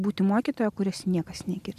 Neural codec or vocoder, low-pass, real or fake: none; 14.4 kHz; real